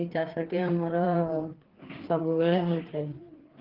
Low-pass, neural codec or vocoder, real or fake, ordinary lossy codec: 5.4 kHz; codec, 16 kHz, 4 kbps, FreqCodec, larger model; fake; Opus, 16 kbps